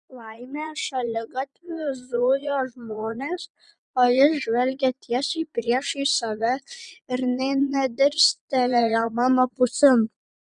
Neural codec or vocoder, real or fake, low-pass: vocoder, 22.05 kHz, 80 mel bands, Vocos; fake; 9.9 kHz